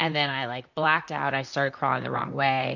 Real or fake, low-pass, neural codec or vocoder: fake; 7.2 kHz; vocoder, 44.1 kHz, 128 mel bands, Pupu-Vocoder